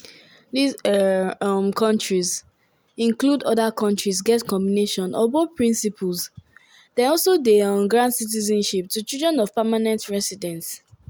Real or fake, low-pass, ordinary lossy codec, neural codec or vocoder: real; none; none; none